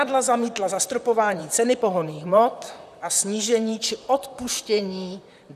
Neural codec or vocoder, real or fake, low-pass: vocoder, 44.1 kHz, 128 mel bands, Pupu-Vocoder; fake; 14.4 kHz